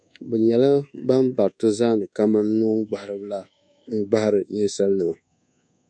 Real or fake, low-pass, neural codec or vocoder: fake; 9.9 kHz; codec, 24 kHz, 1.2 kbps, DualCodec